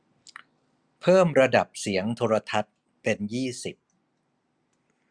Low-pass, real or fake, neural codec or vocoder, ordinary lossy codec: 9.9 kHz; real; none; Opus, 64 kbps